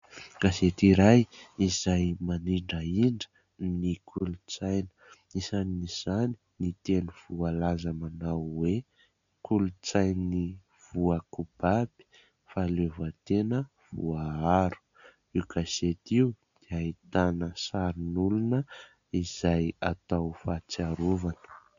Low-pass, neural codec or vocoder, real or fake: 7.2 kHz; none; real